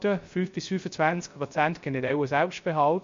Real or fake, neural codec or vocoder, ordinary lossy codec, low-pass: fake; codec, 16 kHz, 0.3 kbps, FocalCodec; MP3, 48 kbps; 7.2 kHz